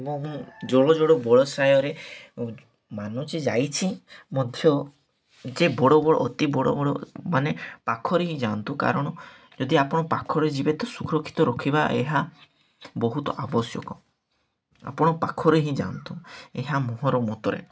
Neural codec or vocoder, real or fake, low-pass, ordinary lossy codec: none; real; none; none